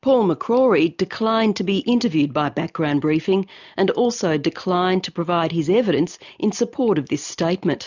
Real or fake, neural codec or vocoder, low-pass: real; none; 7.2 kHz